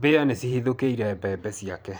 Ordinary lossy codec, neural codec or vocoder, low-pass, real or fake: none; vocoder, 44.1 kHz, 128 mel bands every 512 samples, BigVGAN v2; none; fake